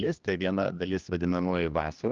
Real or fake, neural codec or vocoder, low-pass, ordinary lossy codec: fake; codec, 16 kHz, 2 kbps, X-Codec, HuBERT features, trained on general audio; 7.2 kHz; Opus, 24 kbps